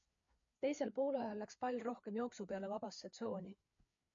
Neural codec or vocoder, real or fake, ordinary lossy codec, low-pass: codec, 16 kHz, 4 kbps, FreqCodec, larger model; fake; MP3, 48 kbps; 7.2 kHz